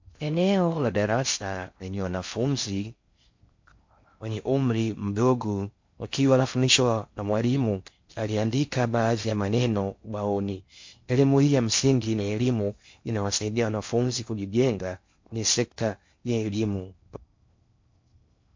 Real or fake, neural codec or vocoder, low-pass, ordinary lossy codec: fake; codec, 16 kHz in and 24 kHz out, 0.6 kbps, FocalCodec, streaming, 4096 codes; 7.2 kHz; MP3, 48 kbps